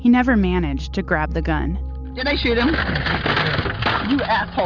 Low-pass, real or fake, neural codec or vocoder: 7.2 kHz; real; none